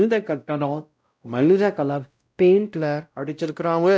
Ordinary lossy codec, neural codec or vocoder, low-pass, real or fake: none; codec, 16 kHz, 0.5 kbps, X-Codec, WavLM features, trained on Multilingual LibriSpeech; none; fake